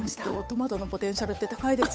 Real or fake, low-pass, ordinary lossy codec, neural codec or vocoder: fake; none; none; codec, 16 kHz, 8 kbps, FunCodec, trained on Chinese and English, 25 frames a second